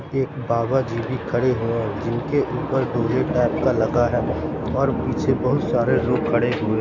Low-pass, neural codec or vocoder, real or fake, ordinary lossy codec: 7.2 kHz; none; real; AAC, 48 kbps